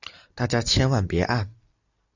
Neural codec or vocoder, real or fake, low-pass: none; real; 7.2 kHz